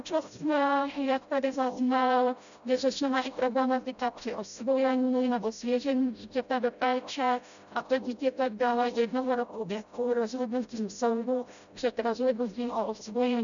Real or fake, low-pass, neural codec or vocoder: fake; 7.2 kHz; codec, 16 kHz, 0.5 kbps, FreqCodec, smaller model